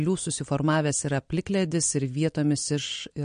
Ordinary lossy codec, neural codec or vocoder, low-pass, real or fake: MP3, 48 kbps; none; 9.9 kHz; real